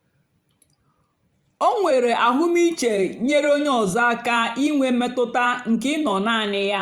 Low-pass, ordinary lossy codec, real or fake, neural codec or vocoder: 19.8 kHz; Opus, 64 kbps; fake; vocoder, 44.1 kHz, 128 mel bands every 512 samples, BigVGAN v2